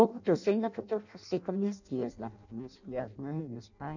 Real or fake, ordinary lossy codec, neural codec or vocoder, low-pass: fake; none; codec, 16 kHz in and 24 kHz out, 0.6 kbps, FireRedTTS-2 codec; 7.2 kHz